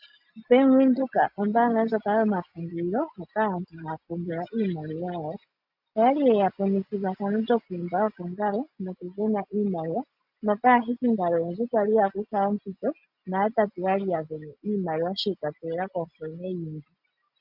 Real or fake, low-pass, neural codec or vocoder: real; 5.4 kHz; none